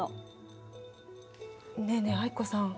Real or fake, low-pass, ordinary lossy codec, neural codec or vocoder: real; none; none; none